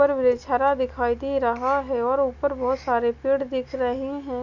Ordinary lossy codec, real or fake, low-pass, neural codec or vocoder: none; real; 7.2 kHz; none